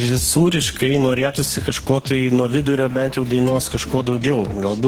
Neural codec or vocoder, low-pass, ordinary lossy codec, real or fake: codec, 44.1 kHz, 2.6 kbps, DAC; 19.8 kHz; Opus, 16 kbps; fake